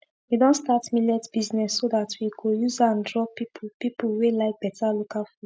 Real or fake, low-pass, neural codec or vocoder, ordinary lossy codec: real; none; none; none